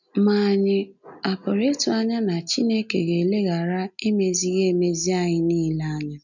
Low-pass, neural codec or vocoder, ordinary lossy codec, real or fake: 7.2 kHz; none; none; real